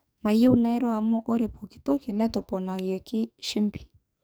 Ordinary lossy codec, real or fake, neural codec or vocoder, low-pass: none; fake; codec, 44.1 kHz, 2.6 kbps, SNAC; none